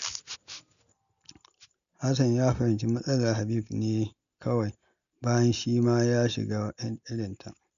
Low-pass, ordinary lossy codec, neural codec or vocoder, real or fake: 7.2 kHz; none; none; real